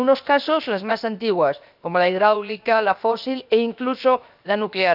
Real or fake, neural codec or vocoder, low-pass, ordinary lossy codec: fake; codec, 16 kHz, 0.7 kbps, FocalCodec; 5.4 kHz; none